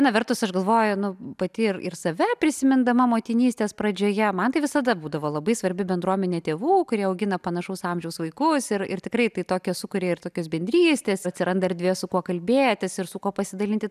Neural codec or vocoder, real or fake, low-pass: none; real; 14.4 kHz